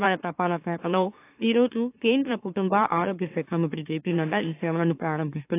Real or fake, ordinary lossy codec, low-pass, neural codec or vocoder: fake; AAC, 24 kbps; 3.6 kHz; autoencoder, 44.1 kHz, a latent of 192 numbers a frame, MeloTTS